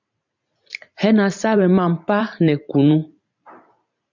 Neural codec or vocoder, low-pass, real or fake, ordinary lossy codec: vocoder, 44.1 kHz, 128 mel bands every 512 samples, BigVGAN v2; 7.2 kHz; fake; MP3, 64 kbps